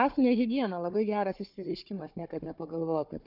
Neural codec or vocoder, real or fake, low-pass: codec, 16 kHz, 4 kbps, FunCodec, trained on Chinese and English, 50 frames a second; fake; 5.4 kHz